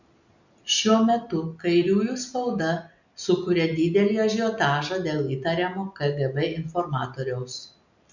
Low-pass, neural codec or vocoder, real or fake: 7.2 kHz; none; real